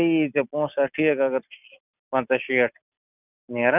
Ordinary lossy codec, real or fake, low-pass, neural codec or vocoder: none; real; 3.6 kHz; none